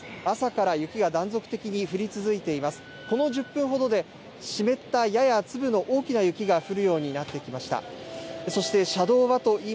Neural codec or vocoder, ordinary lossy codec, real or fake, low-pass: none; none; real; none